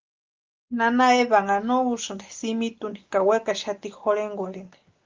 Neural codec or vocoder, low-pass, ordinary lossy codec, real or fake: none; 7.2 kHz; Opus, 32 kbps; real